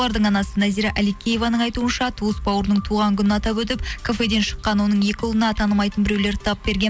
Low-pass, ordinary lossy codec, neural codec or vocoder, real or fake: none; none; none; real